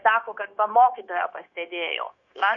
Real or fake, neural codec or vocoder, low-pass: fake; codec, 16 kHz, 0.9 kbps, LongCat-Audio-Codec; 7.2 kHz